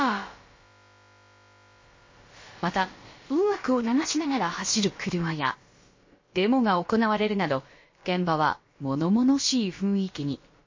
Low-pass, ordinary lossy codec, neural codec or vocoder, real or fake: 7.2 kHz; MP3, 32 kbps; codec, 16 kHz, about 1 kbps, DyCAST, with the encoder's durations; fake